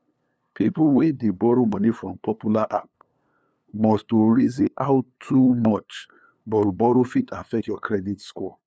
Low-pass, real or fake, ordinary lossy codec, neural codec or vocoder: none; fake; none; codec, 16 kHz, 2 kbps, FunCodec, trained on LibriTTS, 25 frames a second